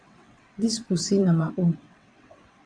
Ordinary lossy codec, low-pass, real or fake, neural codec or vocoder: MP3, 96 kbps; 9.9 kHz; fake; vocoder, 22.05 kHz, 80 mel bands, WaveNeXt